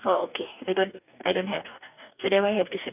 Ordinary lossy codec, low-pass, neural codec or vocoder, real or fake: none; 3.6 kHz; codec, 44.1 kHz, 2.6 kbps, DAC; fake